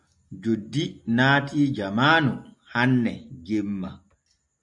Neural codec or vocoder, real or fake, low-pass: none; real; 10.8 kHz